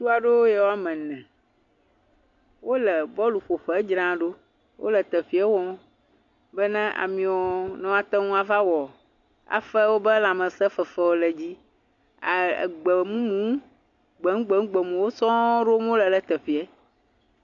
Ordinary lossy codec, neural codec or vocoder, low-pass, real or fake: MP3, 48 kbps; none; 7.2 kHz; real